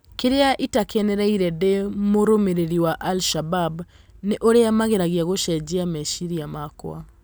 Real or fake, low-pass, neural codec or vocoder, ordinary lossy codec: real; none; none; none